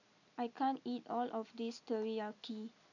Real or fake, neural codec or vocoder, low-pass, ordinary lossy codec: real; none; 7.2 kHz; none